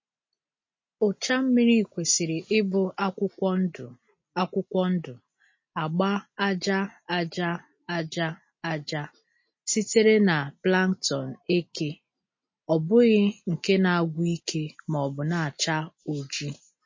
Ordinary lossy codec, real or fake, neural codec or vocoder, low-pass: MP3, 32 kbps; real; none; 7.2 kHz